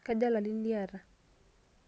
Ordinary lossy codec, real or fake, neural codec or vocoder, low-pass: none; real; none; none